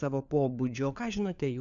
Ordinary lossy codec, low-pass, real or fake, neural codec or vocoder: MP3, 96 kbps; 7.2 kHz; fake; codec, 16 kHz, 4 kbps, FunCodec, trained on LibriTTS, 50 frames a second